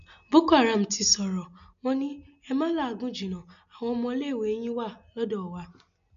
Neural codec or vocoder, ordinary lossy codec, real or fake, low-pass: none; none; real; 7.2 kHz